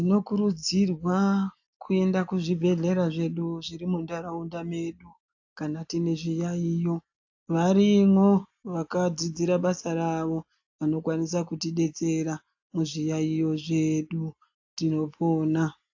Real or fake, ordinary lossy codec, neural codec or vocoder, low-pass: real; AAC, 48 kbps; none; 7.2 kHz